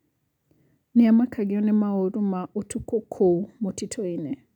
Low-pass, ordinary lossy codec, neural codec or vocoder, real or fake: 19.8 kHz; none; none; real